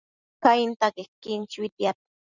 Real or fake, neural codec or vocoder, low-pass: fake; vocoder, 44.1 kHz, 128 mel bands every 256 samples, BigVGAN v2; 7.2 kHz